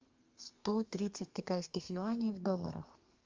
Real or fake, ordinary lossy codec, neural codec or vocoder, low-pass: fake; Opus, 32 kbps; codec, 32 kHz, 1.9 kbps, SNAC; 7.2 kHz